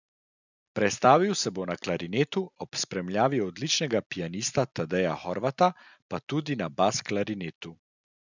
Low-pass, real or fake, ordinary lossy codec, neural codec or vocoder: 7.2 kHz; real; none; none